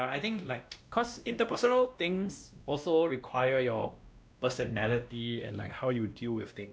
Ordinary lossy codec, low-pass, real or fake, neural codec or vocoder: none; none; fake; codec, 16 kHz, 1 kbps, X-Codec, WavLM features, trained on Multilingual LibriSpeech